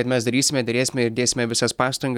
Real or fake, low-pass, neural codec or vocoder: real; 19.8 kHz; none